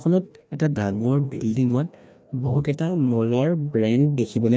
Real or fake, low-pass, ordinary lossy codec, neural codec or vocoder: fake; none; none; codec, 16 kHz, 1 kbps, FreqCodec, larger model